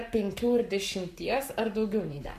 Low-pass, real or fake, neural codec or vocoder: 14.4 kHz; fake; codec, 44.1 kHz, 7.8 kbps, Pupu-Codec